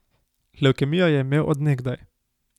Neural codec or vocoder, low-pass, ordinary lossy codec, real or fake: none; 19.8 kHz; none; real